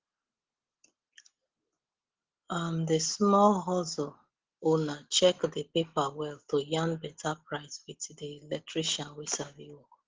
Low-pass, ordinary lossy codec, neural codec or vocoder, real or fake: 7.2 kHz; Opus, 16 kbps; none; real